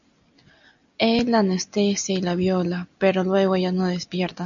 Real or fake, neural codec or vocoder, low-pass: real; none; 7.2 kHz